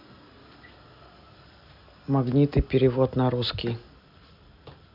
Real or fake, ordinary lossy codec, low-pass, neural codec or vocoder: real; AAC, 48 kbps; 5.4 kHz; none